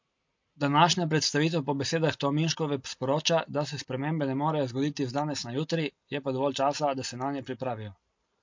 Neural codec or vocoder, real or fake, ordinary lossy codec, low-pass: none; real; MP3, 48 kbps; 7.2 kHz